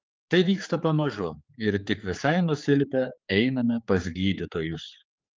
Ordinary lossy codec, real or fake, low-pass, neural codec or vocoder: Opus, 24 kbps; fake; 7.2 kHz; codec, 16 kHz, 4 kbps, X-Codec, HuBERT features, trained on general audio